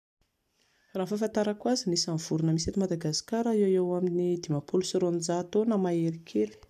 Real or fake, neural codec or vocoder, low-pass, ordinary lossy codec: real; none; 14.4 kHz; none